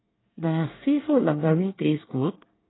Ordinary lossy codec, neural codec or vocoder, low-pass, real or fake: AAC, 16 kbps; codec, 24 kHz, 1 kbps, SNAC; 7.2 kHz; fake